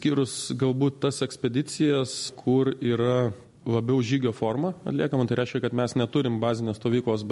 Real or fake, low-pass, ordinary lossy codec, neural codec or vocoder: real; 14.4 kHz; MP3, 48 kbps; none